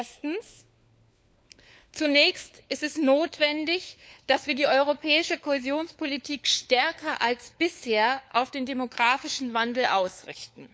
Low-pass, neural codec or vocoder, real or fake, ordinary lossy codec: none; codec, 16 kHz, 4 kbps, FunCodec, trained on LibriTTS, 50 frames a second; fake; none